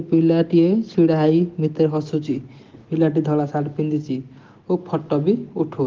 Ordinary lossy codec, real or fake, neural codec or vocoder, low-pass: Opus, 16 kbps; real; none; 7.2 kHz